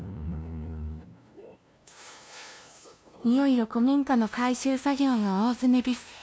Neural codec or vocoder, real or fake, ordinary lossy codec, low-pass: codec, 16 kHz, 0.5 kbps, FunCodec, trained on LibriTTS, 25 frames a second; fake; none; none